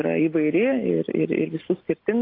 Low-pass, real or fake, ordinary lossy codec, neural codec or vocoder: 5.4 kHz; real; AAC, 32 kbps; none